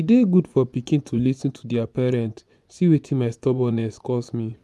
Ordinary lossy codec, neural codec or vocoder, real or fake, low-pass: none; vocoder, 24 kHz, 100 mel bands, Vocos; fake; none